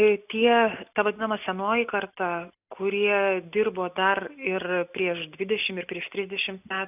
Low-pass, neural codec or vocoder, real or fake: 3.6 kHz; none; real